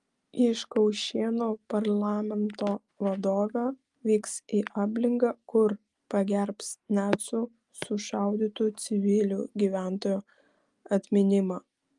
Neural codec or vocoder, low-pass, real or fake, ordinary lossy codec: none; 10.8 kHz; real; Opus, 32 kbps